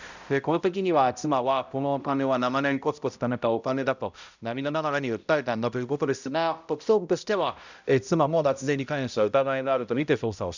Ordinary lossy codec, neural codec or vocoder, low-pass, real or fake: none; codec, 16 kHz, 0.5 kbps, X-Codec, HuBERT features, trained on balanced general audio; 7.2 kHz; fake